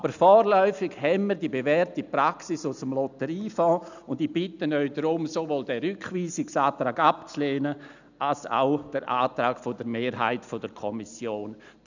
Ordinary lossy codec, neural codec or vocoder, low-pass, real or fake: none; none; 7.2 kHz; real